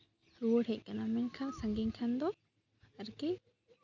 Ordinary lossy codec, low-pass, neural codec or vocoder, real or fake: none; 7.2 kHz; none; real